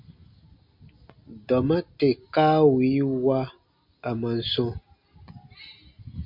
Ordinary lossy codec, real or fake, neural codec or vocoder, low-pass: MP3, 48 kbps; real; none; 5.4 kHz